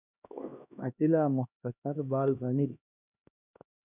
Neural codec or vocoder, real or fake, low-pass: codec, 16 kHz, 1 kbps, X-Codec, HuBERT features, trained on LibriSpeech; fake; 3.6 kHz